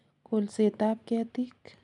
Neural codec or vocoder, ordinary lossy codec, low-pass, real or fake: none; none; 10.8 kHz; real